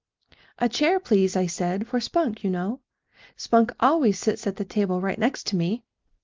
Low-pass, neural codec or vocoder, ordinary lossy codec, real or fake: 7.2 kHz; none; Opus, 32 kbps; real